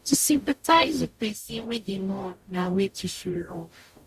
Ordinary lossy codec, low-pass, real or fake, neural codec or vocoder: Opus, 64 kbps; 14.4 kHz; fake; codec, 44.1 kHz, 0.9 kbps, DAC